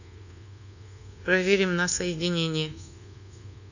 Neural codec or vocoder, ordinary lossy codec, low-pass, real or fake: codec, 24 kHz, 1.2 kbps, DualCodec; none; 7.2 kHz; fake